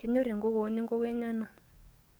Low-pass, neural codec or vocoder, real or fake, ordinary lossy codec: none; codec, 44.1 kHz, 7.8 kbps, DAC; fake; none